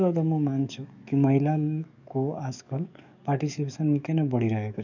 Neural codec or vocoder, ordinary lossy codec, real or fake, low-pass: codec, 44.1 kHz, 7.8 kbps, DAC; none; fake; 7.2 kHz